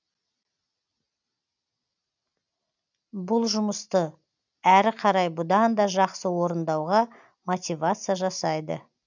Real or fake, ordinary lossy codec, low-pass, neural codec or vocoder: real; none; 7.2 kHz; none